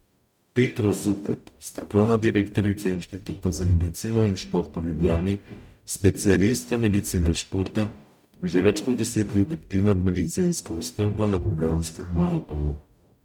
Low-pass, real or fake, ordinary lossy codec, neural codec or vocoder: 19.8 kHz; fake; none; codec, 44.1 kHz, 0.9 kbps, DAC